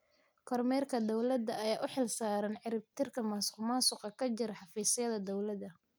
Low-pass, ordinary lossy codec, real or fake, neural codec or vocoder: none; none; real; none